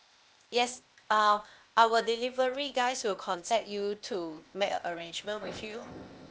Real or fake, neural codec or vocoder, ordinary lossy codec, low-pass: fake; codec, 16 kHz, 0.8 kbps, ZipCodec; none; none